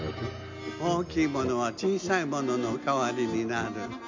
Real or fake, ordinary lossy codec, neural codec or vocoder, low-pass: real; MP3, 48 kbps; none; 7.2 kHz